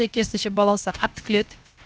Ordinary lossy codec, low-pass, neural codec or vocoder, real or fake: none; none; codec, 16 kHz, 0.7 kbps, FocalCodec; fake